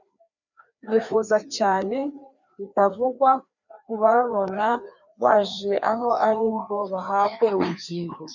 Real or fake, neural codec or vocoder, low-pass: fake; codec, 16 kHz, 2 kbps, FreqCodec, larger model; 7.2 kHz